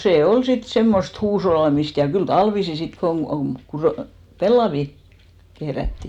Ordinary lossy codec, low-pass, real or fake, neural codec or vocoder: none; 19.8 kHz; real; none